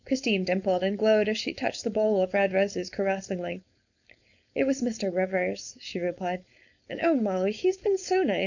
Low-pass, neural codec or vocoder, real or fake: 7.2 kHz; codec, 16 kHz, 4.8 kbps, FACodec; fake